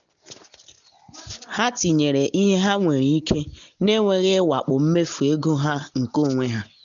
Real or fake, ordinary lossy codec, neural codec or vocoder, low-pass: real; none; none; 7.2 kHz